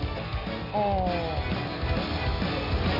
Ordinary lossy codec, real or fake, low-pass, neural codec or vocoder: none; real; 5.4 kHz; none